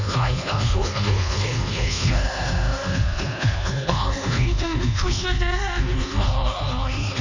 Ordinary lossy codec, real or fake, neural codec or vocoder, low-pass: none; fake; codec, 24 kHz, 1.2 kbps, DualCodec; 7.2 kHz